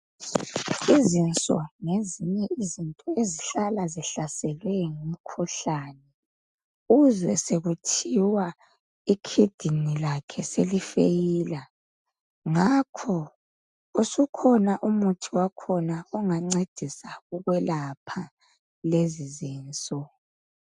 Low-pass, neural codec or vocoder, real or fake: 10.8 kHz; none; real